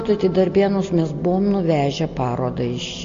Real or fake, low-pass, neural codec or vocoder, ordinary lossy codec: real; 7.2 kHz; none; AAC, 48 kbps